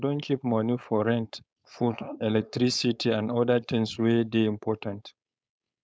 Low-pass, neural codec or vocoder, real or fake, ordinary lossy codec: none; codec, 16 kHz, 4.8 kbps, FACodec; fake; none